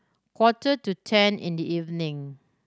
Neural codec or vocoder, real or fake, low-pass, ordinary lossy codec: none; real; none; none